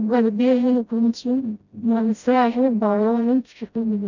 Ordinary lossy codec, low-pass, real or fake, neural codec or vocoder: none; 7.2 kHz; fake; codec, 16 kHz, 0.5 kbps, FreqCodec, smaller model